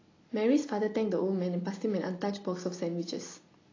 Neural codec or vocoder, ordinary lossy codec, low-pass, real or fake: none; AAC, 32 kbps; 7.2 kHz; real